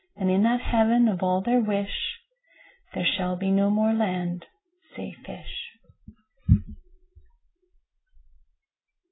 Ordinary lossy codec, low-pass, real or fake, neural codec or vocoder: AAC, 16 kbps; 7.2 kHz; real; none